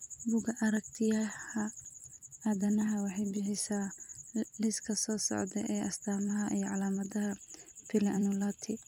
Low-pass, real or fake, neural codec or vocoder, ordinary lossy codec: 19.8 kHz; fake; vocoder, 44.1 kHz, 128 mel bands every 256 samples, BigVGAN v2; none